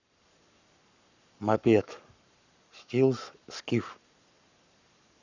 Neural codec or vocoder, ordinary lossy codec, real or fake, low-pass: codec, 44.1 kHz, 7.8 kbps, Pupu-Codec; none; fake; 7.2 kHz